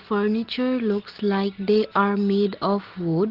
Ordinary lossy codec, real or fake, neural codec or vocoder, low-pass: Opus, 16 kbps; real; none; 5.4 kHz